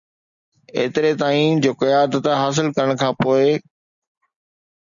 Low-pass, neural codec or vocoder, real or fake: 7.2 kHz; none; real